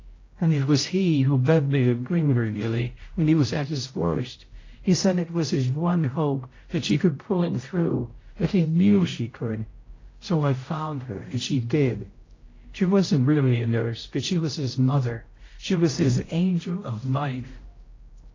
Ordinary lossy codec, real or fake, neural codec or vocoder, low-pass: AAC, 32 kbps; fake; codec, 16 kHz, 0.5 kbps, X-Codec, HuBERT features, trained on general audio; 7.2 kHz